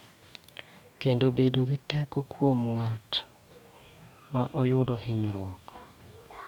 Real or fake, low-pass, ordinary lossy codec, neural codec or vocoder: fake; 19.8 kHz; none; codec, 44.1 kHz, 2.6 kbps, DAC